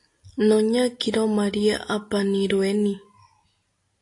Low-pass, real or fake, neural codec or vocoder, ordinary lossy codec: 10.8 kHz; real; none; AAC, 48 kbps